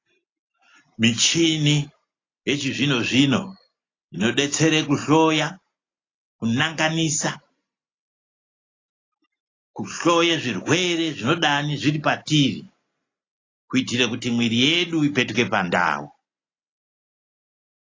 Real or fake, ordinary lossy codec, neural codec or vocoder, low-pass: real; AAC, 32 kbps; none; 7.2 kHz